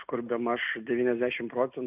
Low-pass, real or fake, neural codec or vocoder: 3.6 kHz; real; none